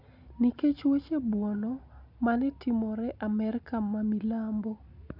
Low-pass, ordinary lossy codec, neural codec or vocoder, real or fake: 5.4 kHz; none; none; real